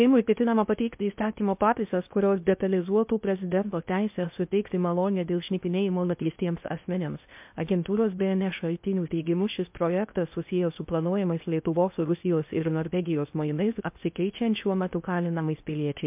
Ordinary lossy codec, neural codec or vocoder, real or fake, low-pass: MP3, 32 kbps; codec, 16 kHz in and 24 kHz out, 0.6 kbps, FocalCodec, streaming, 2048 codes; fake; 3.6 kHz